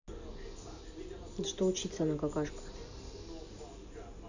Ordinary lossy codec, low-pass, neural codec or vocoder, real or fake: none; 7.2 kHz; none; real